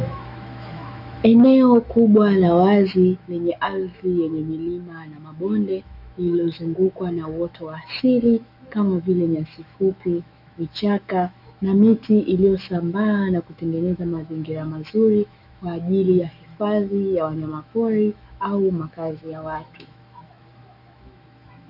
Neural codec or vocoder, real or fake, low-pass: autoencoder, 48 kHz, 128 numbers a frame, DAC-VAE, trained on Japanese speech; fake; 5.4 kHz